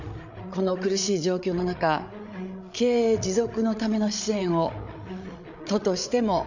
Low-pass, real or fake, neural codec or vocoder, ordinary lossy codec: 7.2 kHz; fake; codec, 16 kHz, 8 kbps, FreqCodec, larger model; none